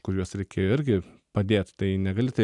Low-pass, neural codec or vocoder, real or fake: 10.8 kHz; none; real